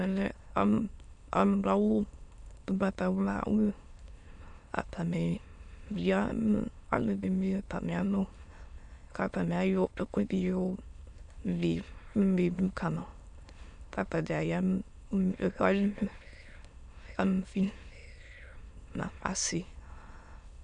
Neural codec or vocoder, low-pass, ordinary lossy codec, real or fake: autoencoder, 22.05 kHz, a latent of 192 numbers a frame, VITS, trained on many speakers; 9.9 kHz; MP3, 96 kbps; fake